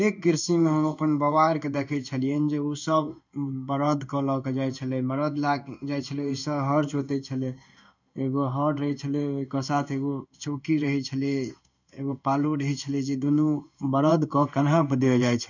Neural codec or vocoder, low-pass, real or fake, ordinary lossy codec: codec, 16 kHz in and 24 kHz out, 1 kbps, XY-Tokenizer; 7.2 kHz; fake; none